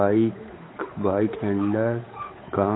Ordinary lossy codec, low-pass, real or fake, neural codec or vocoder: AAC, 16 kbps; 7.2 kHz; fake; codec, 16 kHz, 8 kbps, FunCodec, trained on Chinese and English, 25 frames a second